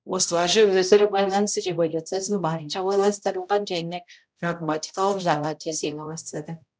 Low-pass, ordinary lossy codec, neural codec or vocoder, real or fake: none; none; codec, 16 kHz, 0.5 kbps, X-Codec, HuBERT features, trained on balanced general audio; fake